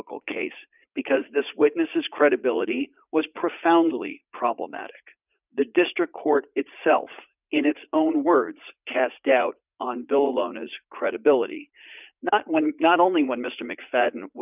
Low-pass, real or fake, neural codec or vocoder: 3.6 kHz; fake; vocoder, 44.1 kHz, 80 mel bands, Vocos